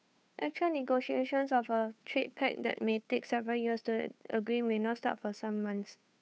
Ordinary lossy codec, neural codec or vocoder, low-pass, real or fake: none; codec, 16 kHz, 2 kbps, FunCodec, trained on Chinese and English, 25 frames a second; none; fake